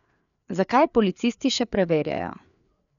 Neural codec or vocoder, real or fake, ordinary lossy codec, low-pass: codec, 16 kHz, 4 kbps, FreqCodec, larger model; fake; none; 7.2 kHz